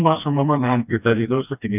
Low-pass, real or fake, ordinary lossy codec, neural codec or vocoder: 3.6 kHz; fake; none; codec, 16 kHz, 1 kbps, FreqCodec, smaller model